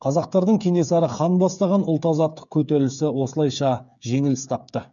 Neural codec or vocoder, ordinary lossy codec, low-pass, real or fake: codec, 16 kHz, 8 kbps, FreqCodec, smaller model; none; 7.2 kHz; fake